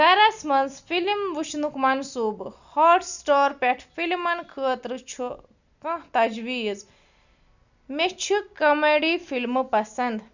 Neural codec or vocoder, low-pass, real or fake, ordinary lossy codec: none; 7.2 kHz; real; none